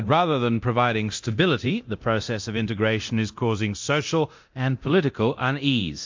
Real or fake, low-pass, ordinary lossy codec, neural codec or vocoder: fake; 7.2 kHz; MP3, 48 kbps; codec, 24 kHz, 0.9 kbps, DualCodec